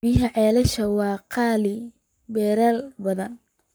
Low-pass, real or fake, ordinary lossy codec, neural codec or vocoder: none; fake; none; codec, 44.1 kHz, 7.8 kbps, Pupu-Codec